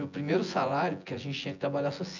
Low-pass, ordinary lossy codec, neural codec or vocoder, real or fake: 7.2 kHz; none; vocoder, 24 kHz, 100 mel bands, Vocos; fake